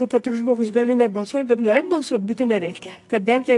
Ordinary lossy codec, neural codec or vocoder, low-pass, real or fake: AAC, 64 kbps; codec, 24 kHz, 0.9 kbps, WavTokenizer, medium music audio release; 10.8 kHz; fake